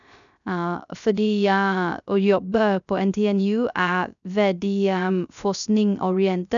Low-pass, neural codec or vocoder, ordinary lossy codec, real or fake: 7.2 kHz; codec, 16 kHz, 0.3 kbps, FocalCodec; none; fake